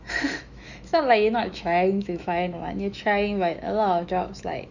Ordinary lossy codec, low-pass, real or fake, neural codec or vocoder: none; 7.2 kHz; fake; autoencoder, 48 kHz, 128 numbers a frame, DAC-VAE, trained on Japanese speech